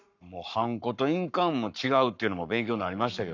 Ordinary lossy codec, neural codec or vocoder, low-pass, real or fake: none; codec, 44.1 kHz, 7.8 kbps, Pupu-Codec; 7.2 kHz; fake